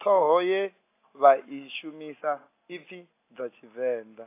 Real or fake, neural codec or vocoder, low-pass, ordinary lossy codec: real; none; 3.6 kHz; AAC, 24 kbps